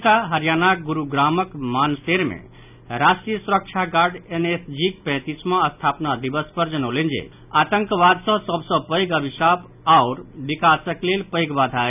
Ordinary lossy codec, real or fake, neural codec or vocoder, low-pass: none; real; none; 3.6 kHz